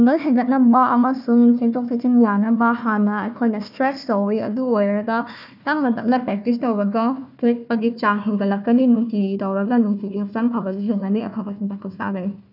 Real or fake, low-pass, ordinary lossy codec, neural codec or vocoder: fake; 5.4 kHz; none; codec, 16 kHz, 1 kbps, FunCodec, trained on Chinese and English, 50 frames a second